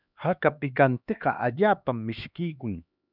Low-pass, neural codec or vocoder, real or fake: 5.4 kHz; codec, 16 kHz, 2 kbps, X-Codec, HuBERT features, trained on LibriSpeech; fake